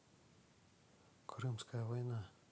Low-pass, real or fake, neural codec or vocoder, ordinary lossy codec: none; real; none; none